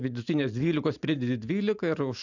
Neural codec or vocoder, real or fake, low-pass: none; real; 7.2 kHz